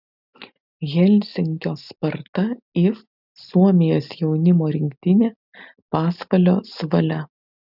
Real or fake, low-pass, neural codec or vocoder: real; 5.4 kHz; none